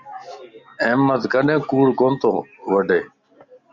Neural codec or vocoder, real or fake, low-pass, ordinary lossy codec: none; real; 7.2 kHz; Opus, 64 kbps